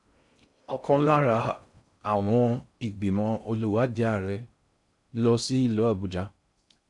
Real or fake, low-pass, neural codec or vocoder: fake; 10.8 kHz; codec, 16 kHz in and 24 kHz out, 0.6 kbps, FocalCodec, streaming, 4096 codes